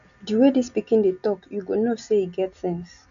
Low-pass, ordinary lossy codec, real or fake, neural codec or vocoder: 7.2 kHz; none; real; none